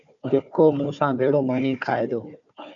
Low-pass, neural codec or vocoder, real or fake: 7.2 kHz; codec, 16 kHz, 4 kbps, FunCodec, trained on Chinese and English, 50 frames a second; fake